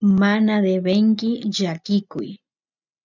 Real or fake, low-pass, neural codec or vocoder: real; 7.2 kHz; none